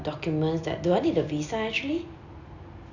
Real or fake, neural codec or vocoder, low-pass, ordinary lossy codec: real; none; 7.2 kHz; none